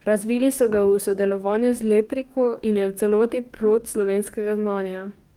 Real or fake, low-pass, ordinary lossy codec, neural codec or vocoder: fake; 19.8 kHz; Opus, 32 kbps; codec, 44.1 kHz, 2.6 kbps, DAC